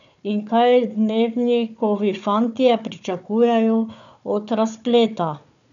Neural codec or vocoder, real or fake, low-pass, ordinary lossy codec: codec, 16 kHz, 4 kbps, FunCodec, trained on Chinese and English, 50 frames a second; fake; 7.2 kHz; none